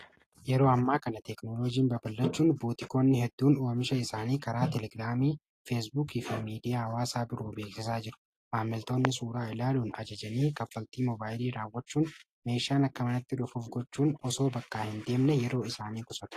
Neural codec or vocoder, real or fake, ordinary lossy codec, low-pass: none; real; AAC, 48 kbps; 14.4 kHz